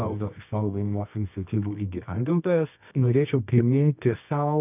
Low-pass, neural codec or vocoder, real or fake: 3.6 kHz; codec, 24 kHz, 0.9 kbps, WavTokenizer, medium music audio release; fake